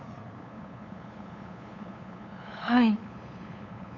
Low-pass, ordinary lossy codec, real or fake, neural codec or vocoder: 7.2 kHz; none; fake; codec, 16 kHz, 8 kbps, FunCodec, trained on LibriTTS, 25 frames a second